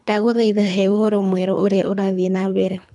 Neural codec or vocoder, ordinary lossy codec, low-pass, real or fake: codec, 24 kHz, 3 kbps, HILCodec; none; 10.8 kHz; fake